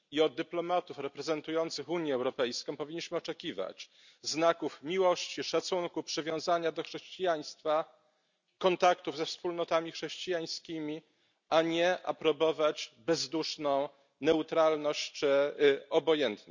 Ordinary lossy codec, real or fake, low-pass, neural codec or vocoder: none; real; 7.2 kHz; none